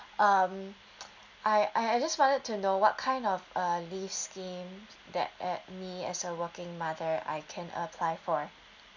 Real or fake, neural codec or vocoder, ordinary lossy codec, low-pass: real; none; none; 7.2 kHz